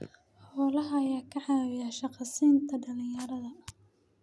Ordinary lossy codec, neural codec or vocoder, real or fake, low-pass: none; none; real; none